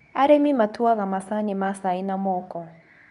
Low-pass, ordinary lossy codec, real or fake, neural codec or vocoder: 10.8 kHz; none; fake; codec, 24 kHz, 0.9 kbps, WavTokenizer, medium speech release version 2